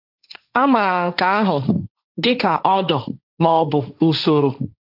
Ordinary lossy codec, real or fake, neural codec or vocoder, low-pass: none; fake; codec, 16 kHz, 1.1 kbps, Voila-Tokenizer; 5.4 kHz